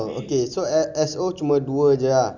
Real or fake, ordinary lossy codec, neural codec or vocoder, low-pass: real; none; none; 7.2 kHz